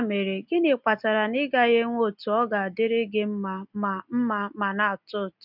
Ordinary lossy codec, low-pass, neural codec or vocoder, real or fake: none; 5.4 kHz; none; real